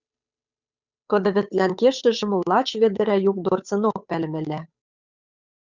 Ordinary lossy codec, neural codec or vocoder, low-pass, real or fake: Opus, 64 kbps; codec, 16 kHz, 8 kbps, FunCodec, trained on Chinese and English, 25 frames a second; 7.2 kHz; fake